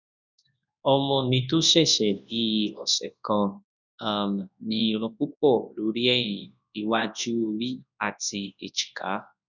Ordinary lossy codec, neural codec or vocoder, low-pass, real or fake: none; codec, 24 kHz, 0.9 kbps, WavTokenizer, large speech release; 7.2 kHz; fake